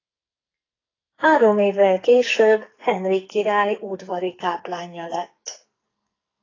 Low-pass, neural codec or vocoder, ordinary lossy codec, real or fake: 7.2 kHz; codec, 44.1 kHz, 2.6 kbps, SNAC; AAC, 32 kbps; fake